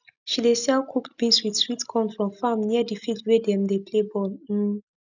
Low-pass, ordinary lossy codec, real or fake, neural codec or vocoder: 7.2 kHz; none; real; none